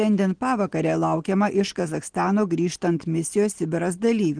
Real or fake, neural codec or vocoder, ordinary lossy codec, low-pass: real; none; Opus, 16 kbps; 9.9 kHz